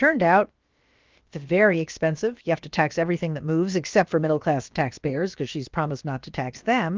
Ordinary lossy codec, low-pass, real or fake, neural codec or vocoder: Opus, 24 kbps; 7.2 kHz; fake; codec, 16 kHz, about 1 kbps, DyCAST, with the encoder's durations